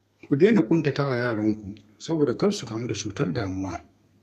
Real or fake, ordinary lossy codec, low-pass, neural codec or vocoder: fake; none; 14.4 kHz; codec, 32 kHz, 1.9 kbps, SNAC